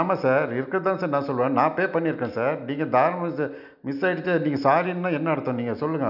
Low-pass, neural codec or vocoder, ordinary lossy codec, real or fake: 5.4 kHz; none; none; real